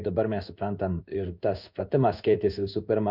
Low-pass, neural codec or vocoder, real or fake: 5.4 kHz; codec, 16 kHz in and 24 kHz out, 1 kbps, XY-Tokenizer; fake